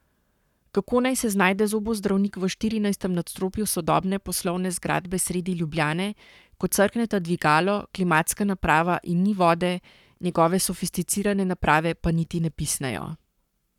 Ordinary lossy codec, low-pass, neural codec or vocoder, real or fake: none; 19.8 kHz; codec, 44.1 kHz, 7.8 kbps, Pupu-Codec; fake